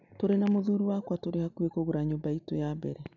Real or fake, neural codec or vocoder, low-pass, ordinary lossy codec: real; none; 7.2 kHz; none